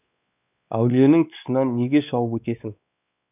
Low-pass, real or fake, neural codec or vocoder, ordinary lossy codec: 3.6 kHz; fake; codec, 16 kHz, 2 kbps, X-Codec, WavLM features, trained on Multilingual LibriSpeech; none